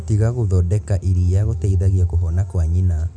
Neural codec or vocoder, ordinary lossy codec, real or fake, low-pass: none; none; real; none